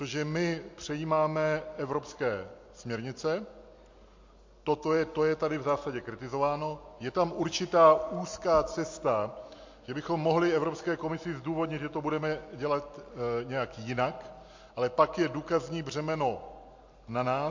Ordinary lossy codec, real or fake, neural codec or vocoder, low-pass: MP3, 48 kbps; real; none; 7.2 kHz